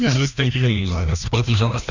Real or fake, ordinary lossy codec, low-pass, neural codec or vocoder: fake; none; 7.2 kHz; codec, 16 kHz, 1 kbps, FreqCodec, larger model